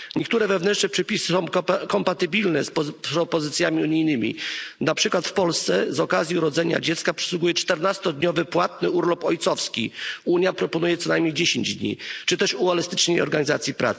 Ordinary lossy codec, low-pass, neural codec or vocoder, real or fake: none; none; none; real